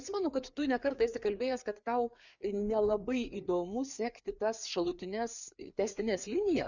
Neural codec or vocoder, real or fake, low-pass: codec, 16 kHz, 4 kbps, FreqCodec, larger model; fake; 7.2 kHz